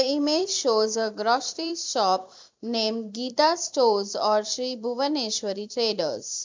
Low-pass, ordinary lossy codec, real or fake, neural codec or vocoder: 7.2 kHz; MP3, 64 kbps; fake; vocoder, 44.1 kHz, 128 mel bands every 256 samples, BigVGAN v2